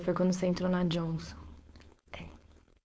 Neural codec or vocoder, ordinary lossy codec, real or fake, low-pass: codec, 16 kHz, 4.8 kbps, FACodec; none; fake; none